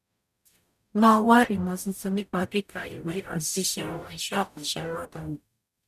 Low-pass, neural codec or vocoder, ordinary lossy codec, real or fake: 14.4 kHz; codec, 44.1 kHz, 0.9 kbps, DAC; none; fake